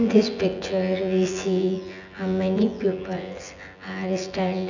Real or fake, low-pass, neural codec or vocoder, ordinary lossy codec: fake; 7.2 kHz; vocoder, 24 kHz, 100 mel bands, Vocos; none